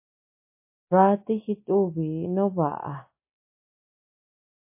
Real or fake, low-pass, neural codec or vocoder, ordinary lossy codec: real; 3.6 kHz; none; MP3, 24 kbps